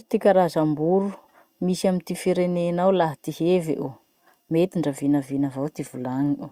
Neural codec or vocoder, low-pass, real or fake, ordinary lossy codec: none; 19.8 kHz; real; Opus, 64 kbps